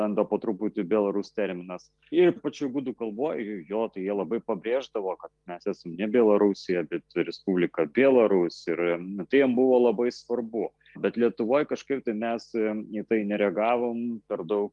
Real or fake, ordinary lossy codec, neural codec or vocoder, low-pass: real; Opus, 24 kbps; none; 10.8 kHz